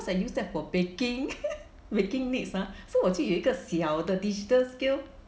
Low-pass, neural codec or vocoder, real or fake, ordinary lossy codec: none; none; real; none